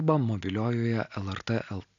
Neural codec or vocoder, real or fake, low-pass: none; real; 7.2 kHz